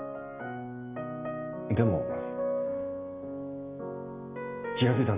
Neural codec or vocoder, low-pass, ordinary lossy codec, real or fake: none; 3.6 kHz; none; real